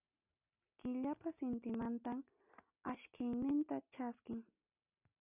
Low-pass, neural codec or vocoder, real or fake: 3.6 kHz; none; real